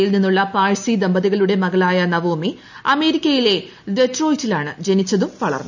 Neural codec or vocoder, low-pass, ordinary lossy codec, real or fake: none; 7.2 kHz; none; real